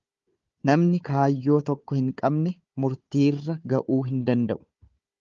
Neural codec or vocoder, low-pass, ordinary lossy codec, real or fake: codec, 16 kHz, 4 kbps, FunCodec, trained on Chinese and English, 50 frames a second; 7.2 kHz; Opus, 32 kbps; fake